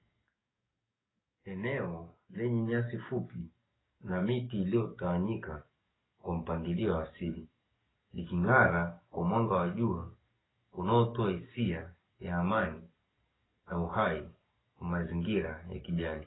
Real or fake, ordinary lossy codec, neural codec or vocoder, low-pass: fake; AAC, 16 kbps; codec, 44.1 kHz, 7.8 kbps, DAC; 7.2 kHz